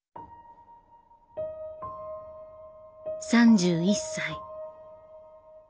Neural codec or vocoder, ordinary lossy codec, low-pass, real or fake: none; none; none; real